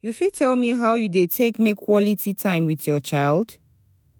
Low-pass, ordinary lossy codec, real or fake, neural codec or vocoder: none; none; fake; autoencoder, 48 kHz, 32 numbers a frame, DAC-VAE, trained on Japanese speech